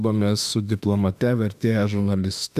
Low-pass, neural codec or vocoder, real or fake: 14.4 kHz; autoencoder, 48 kHz, 32 numbers a frame, DAC-VAE, trained on Japanese speech; fake